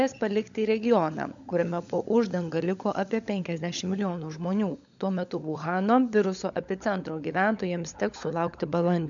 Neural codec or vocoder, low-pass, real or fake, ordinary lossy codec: codec, 16 kHz, 16 kbps, FunCodec, trained on LibriTTS, 50 frames a second; 7.2 kHz; fake; MP3, 64 kbps